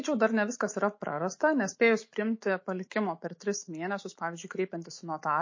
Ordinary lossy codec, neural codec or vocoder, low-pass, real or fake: MP3, 32 kbps; autoencoder, 48 kHz, 128 numbers a frame, DAC-VAE, trained on Japanese speech; 7.2 kHz; fake